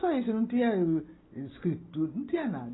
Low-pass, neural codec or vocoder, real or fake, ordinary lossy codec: 7.2 kHz; none; real; AAC, 16 kbps